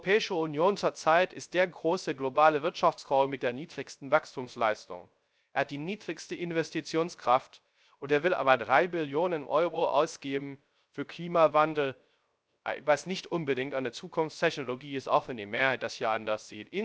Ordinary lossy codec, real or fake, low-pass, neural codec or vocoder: none; fake; none; codec, 16 kHz, 0.3 kbps, FocalCodec